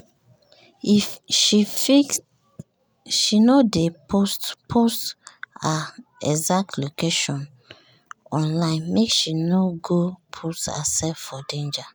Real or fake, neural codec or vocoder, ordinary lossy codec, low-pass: real; none; none; none